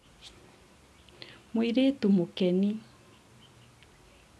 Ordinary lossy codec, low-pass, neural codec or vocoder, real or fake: none; none; none; real